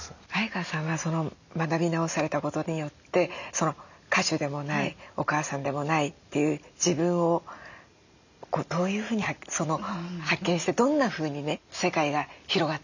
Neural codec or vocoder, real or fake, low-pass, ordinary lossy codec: none; real; 7.2 kHz; none